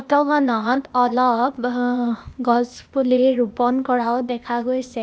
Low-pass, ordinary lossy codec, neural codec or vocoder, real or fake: none; none; codec, 16 kHz, 0.8 kbps, ZipCodec; fake